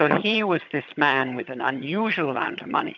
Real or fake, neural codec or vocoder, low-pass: fake; vocoder, 22.05 kHz, 80 mel bands, HiFi-GAN; 7.2 kHz